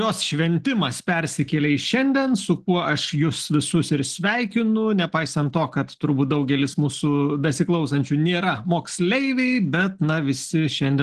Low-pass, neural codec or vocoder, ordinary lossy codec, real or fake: 10.8 kHz; none; Opus, 16 kbps; real